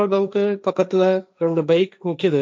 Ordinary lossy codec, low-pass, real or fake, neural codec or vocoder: none; none; fake; codec, 16 kHz, 1.1 kbps, Voila-Tokenizer